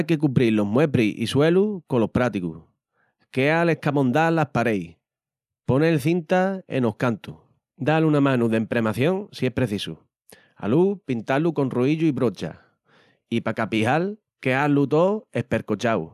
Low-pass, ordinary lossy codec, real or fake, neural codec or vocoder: 14.4 kHz; none; real; none